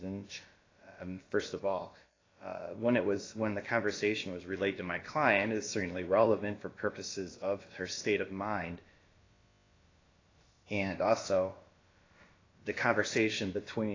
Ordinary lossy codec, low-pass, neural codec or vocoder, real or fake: AAC, 32 kbps; 7.2 kHz; codec, 16 kHz, about 1 kbps, DyCAST, with the encoder's durations; fake